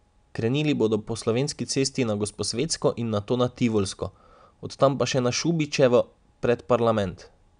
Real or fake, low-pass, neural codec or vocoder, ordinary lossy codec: real; 9.9 kHz; none; none